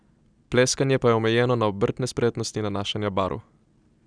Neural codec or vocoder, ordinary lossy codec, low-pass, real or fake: none; none; 9.9 kHz; real